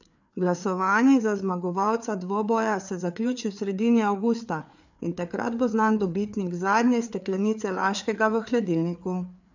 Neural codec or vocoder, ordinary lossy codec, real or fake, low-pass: codec, 16 kHz, 4 kbps, FreqCodec, larger model; none; fake; 7.2 kHz